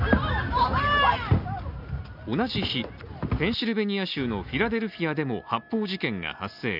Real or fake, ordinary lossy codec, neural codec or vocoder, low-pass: real; none; none; 5.4 kHz